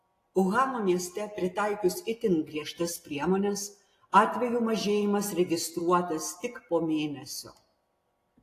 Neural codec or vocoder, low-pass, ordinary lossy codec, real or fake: none; 14.4 kHz; AAC, 48 kbps; real